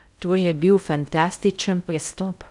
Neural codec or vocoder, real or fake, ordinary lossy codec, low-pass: codec, 16 kHz in and 24 kHz out, 0.6 kbps, FocalCodec, streaming, 2048 codes; fake; none; 10.8 kHz